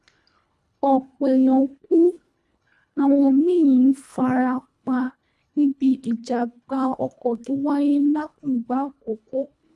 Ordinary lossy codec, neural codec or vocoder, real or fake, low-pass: none; codec, 24 kHz, 1.5 kbps, HILCodec; fake; none